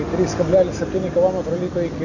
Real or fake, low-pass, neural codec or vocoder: real; 7.2 kHz; none